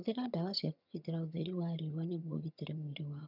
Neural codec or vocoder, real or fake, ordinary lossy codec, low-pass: vocoder, 22.05 kHz, 80 mel bands, HiFi-GAN; fake; none; 5.4 kHz